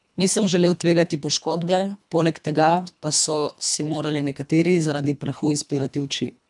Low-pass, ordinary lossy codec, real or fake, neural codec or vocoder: 10.8 kHz; none; fake; codec, 24 kHz, 1.5 kbps, HILCodec